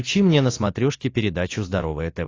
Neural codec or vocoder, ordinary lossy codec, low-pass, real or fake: none; AAC, 32 kbps; 7.2 kHz; real